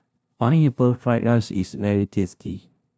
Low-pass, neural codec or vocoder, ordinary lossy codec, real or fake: none; codec, 16 kHz, 0.5 kbps, FunCodec, trained on LibriTTS, 25 frames a second; none; fake